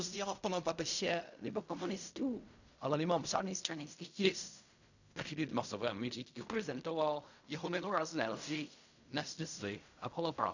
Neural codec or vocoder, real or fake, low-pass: codec, 16 kHz in and 24 kHz out, 0.4 kbps, LongCat-Audio-Codec, fine tuned four codebook decoder; fake; 7.2 kHz